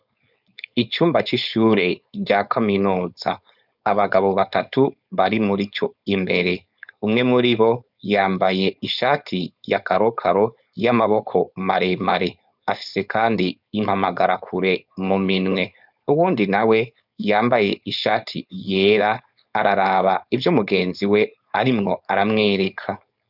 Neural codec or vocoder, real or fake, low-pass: codec, 16 kHz, 4.8 kbps, FACodec; fake; 5.4 kHz